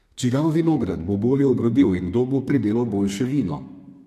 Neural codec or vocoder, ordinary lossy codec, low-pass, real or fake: codec, 32 kHz, 1.9 kbps, SNAC; AAC, 64 kbps; 14.4 kHz; fake